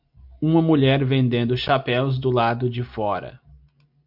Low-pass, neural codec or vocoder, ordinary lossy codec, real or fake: 5.4 kHz; none; AAC, 48 kbps; real